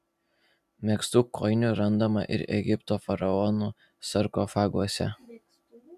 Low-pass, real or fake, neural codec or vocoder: 14.4 kHz; real; none